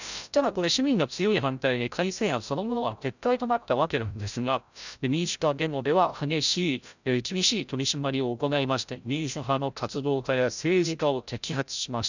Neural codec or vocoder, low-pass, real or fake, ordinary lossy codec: codec, 16 kHz, 0.5 kbps, FreqCodec, larger model; 7.2 kHz; fake; none